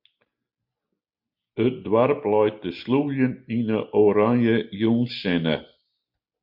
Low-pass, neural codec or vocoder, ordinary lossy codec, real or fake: 5.4 kHz; none; MP3, 48 kbps; real